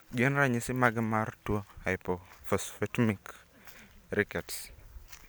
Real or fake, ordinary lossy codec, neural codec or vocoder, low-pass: real; none; none; none